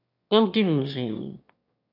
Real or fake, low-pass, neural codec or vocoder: fake; 5.4 kHz; autoencoder, 22.05 kHz, a latent of 192 numbers a frame, VITS, trained on one speaker